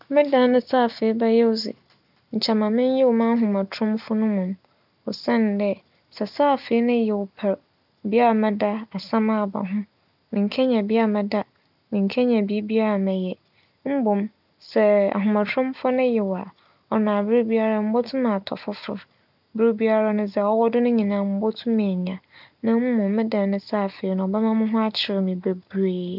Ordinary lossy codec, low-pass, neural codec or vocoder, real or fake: none; 5.4 kHz; none; real